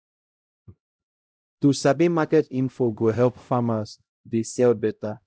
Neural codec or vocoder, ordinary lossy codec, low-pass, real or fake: codec, 16 kHz, 0.5 kbps, X-Codec, HuBERT features, trained on LibriSpeech; none; none; fake